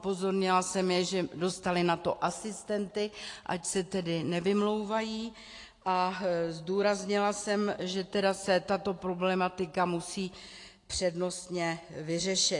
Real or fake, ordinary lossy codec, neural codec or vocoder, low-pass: real; AAC, 48 kbps; none; 10.8 kHz